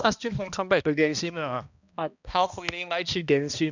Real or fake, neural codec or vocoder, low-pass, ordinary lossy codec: fake; codec, 16 kHz, 1 kbps, X-Codec, HuBERT features, trained on balanced general audio; 7.2 kHz; none